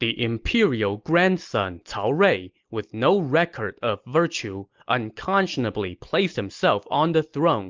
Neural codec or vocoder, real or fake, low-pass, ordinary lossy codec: none; real; 7.2 kHz; Opus, 24 kbps